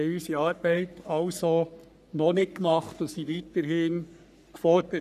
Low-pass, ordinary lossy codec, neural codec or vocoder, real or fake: 14.4 kHz; none; codec, 44.1 kHz, 3.4 kbps, Pupu-Codec; fake